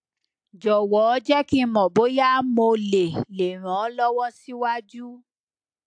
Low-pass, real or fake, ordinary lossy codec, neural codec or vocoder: 9.9 kHz; real; AAC, 64 kbps; none